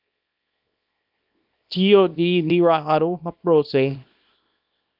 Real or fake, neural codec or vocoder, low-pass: fake; codec, 24 kHz, 0.9 kbps, WavTokenizer, small release; 5.4 kHz